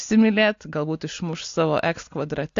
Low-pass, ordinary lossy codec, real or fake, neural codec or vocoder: 7.2 kHz; AAC, 48 kbps; real; none